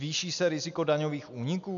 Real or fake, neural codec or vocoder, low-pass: real; none; 7.2 kHz